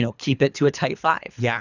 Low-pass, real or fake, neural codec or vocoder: 7.2 kHz; fake; codec, 24 kHz, 3 kbps, HILCodec